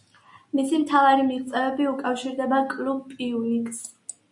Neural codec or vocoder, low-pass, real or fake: none; 10.8 kHz; real